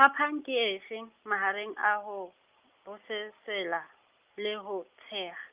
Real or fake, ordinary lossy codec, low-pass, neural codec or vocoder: real; Opus, 24 kbps; 3.6 kHz; none